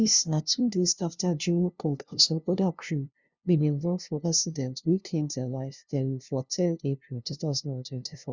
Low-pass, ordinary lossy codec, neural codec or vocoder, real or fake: 7.2 kHz; Opus, 64 kbps; codec, 16 kHz, 0.5 kbps, FunCodec, trained on LibriTTS, 25 frames a second; fake